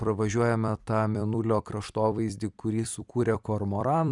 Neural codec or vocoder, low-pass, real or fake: vocoder, 44.1 kHz, 128 mel bands every 256 samples, BigVGAN v2; 10.8 kHz; fake